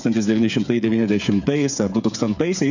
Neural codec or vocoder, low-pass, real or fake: codec, 16 kHz, 8 kbps, FreqCodec, smaller model; 7.2 kHz; fake